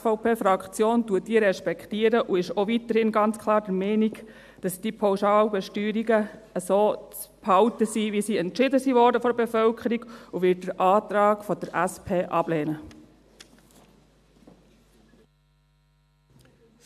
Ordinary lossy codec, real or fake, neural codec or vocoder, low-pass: AAC, 96 kbps; real; none; 14.4 kHz